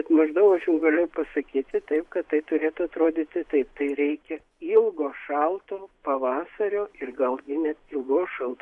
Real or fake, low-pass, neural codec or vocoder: fake; 10.8 kHz; vocoder, 24 kHz, 100 mel bands, Vocos